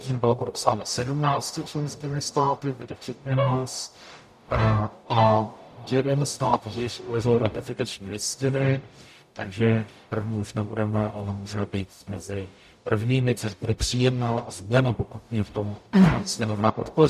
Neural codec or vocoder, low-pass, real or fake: codec, 44.1 kHz, 0.9 kbps, DAC; 14.4 kHz; fake